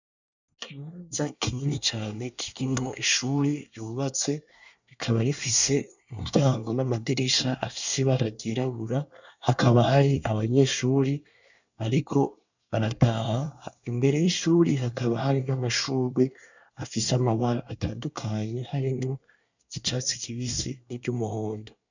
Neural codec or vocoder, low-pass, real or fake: codec, 24 kHz, 1 kbps, SNAC; 7.2 kHz; fake